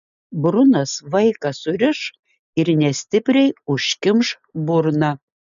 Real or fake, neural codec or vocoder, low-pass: real; none; 7.2 kHz